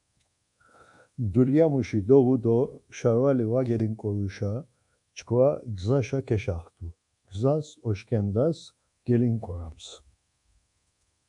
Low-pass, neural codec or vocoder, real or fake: 10.8 kHz; codec, 24 kHz, 1.2 kbps, DualCodec; fake